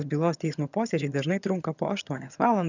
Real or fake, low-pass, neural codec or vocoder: fake; 7.2 kHz; vocoder, 22.05 kHz, 80 mel bands, HiFi-GAN